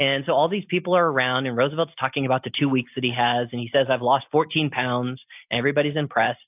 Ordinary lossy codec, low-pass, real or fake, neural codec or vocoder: AAC, 32 kbps; 3.6 kHz; real; none